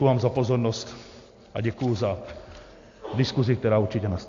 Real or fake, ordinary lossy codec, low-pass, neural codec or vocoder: real; AAC, 48 kbps; 7.2 kHz; none